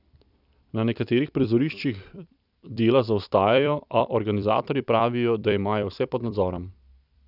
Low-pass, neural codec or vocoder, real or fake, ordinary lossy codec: 5.4 kHz; vocoder, 44.1 kHz, 128 mel bands every 256 samples, BigVGAN v2; fake; none